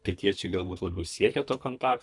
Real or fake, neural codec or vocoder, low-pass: fake; codec, 24 kHz, 3 kbps, HILCodec; 10.8 kHz